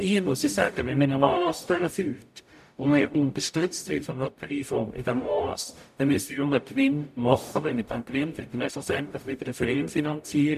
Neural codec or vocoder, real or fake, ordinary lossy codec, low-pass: codec, 44.1 kHz, 0.9 kbps, DAC; fake; none; 14.4 kHz